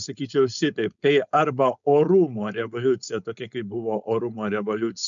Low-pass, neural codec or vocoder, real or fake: 7.2 kHz; codec, 16 kHz, 4.8 kbps, FACodec; fake